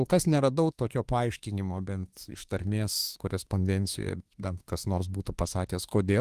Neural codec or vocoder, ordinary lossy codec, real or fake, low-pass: autoencoder, 48 kHz, 32 numbers a frame, DAC-VAE, trained on Japanese speech; Opus, 24 kbps; fake; 14.4 kHz